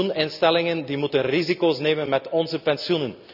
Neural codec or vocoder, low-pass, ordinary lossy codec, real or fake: none; 5.4 kHz; none; real